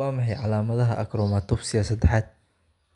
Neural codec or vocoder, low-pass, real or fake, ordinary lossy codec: none; 10.8 kHz; real; none